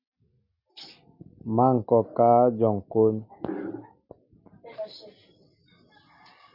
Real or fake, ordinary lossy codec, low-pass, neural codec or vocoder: real; Opus, 64 kbps; 5.4 kHz; none